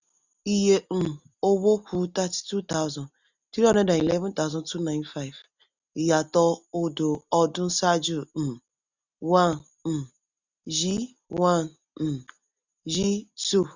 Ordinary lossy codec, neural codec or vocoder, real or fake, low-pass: none; none; real; 7.2 kHz